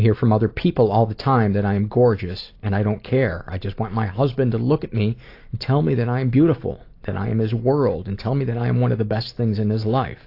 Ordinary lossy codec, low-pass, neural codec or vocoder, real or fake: AAC, 32 kbps; 5.4 kHz; none; real